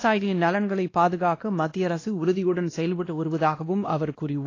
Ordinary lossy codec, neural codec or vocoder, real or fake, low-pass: AAC, 32 kbps; codec, 16 kHz, 1 kbps, X-Codec, WavLM features, trained on Multilingual LibriSpeech; fake; 7.2 kHz